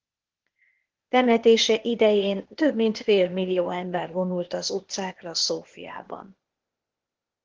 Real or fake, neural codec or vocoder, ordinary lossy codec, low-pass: fake; codec, 16 kHz, 0.8 kbps, ZipCodec; Opus, 16 kbps; 7.2 kHz